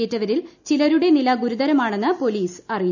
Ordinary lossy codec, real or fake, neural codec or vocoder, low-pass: none; real; none; 7.2 kHz